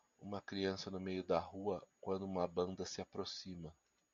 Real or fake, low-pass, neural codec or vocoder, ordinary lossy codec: real; 7.2 kHz; none; MP3, 64 kbps